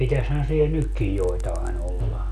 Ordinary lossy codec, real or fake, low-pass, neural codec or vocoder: none; real; 14.4 kHz; none